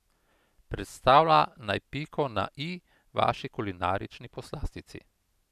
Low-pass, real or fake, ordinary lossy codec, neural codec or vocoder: 14.4 kHz; real; none; none